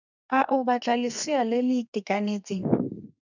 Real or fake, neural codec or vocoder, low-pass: fake; codec, 32 kHz, 1.9 kbps, SNAC; 7.2 kHz